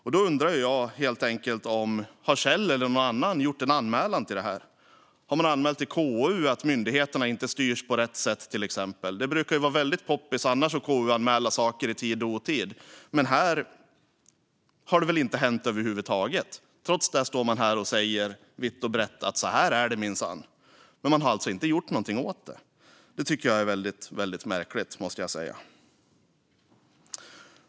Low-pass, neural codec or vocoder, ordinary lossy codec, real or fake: none; none; none; real